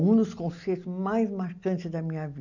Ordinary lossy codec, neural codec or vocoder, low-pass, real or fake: none; none; 7.2 kHz; real